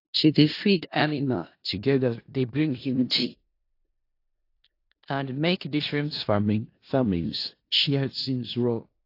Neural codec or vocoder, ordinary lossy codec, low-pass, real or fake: codec, 16 kHz in and 24 kHz out, 0.4 kbps, LongCat-Audio-Codec, four codebook decoder; AAC, 32 kbps; 5.4 kHz; fake